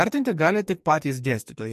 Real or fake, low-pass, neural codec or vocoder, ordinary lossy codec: fake; 14.4 kHz; codec, 44.1 kHz, 2.6 kbps, SNAC; MP3, 64 kbps